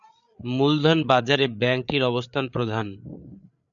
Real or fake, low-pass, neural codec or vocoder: fake; 7.2 kHz; codec, 16 kHz, 8 kbps, FreqCodec, larger model